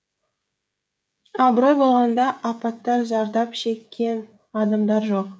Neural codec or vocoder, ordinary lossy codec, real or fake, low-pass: codec, 16 kHz, 16 kbps, FreqCodec, smaller model; none; fake; none